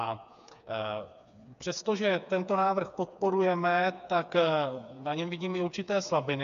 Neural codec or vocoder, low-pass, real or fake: codec, 16 kHz, 4 kbps, FreqCodec, smaller model; 7.2 kHz; fake